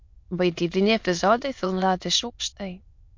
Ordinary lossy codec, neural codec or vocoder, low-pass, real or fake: MP3, 48 kbps; autoencoder, 22.05 kHz, a latent of 192 numbers a frame, VITS, trained on many speakers; 7.2 kHz; fake